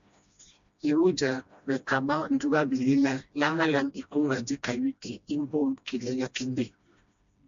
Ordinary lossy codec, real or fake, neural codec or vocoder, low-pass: MP3, 64 kbps; fake; codec, 16 kHz, 1 kbps, FreqCodec, smaller model; 7.2 kHz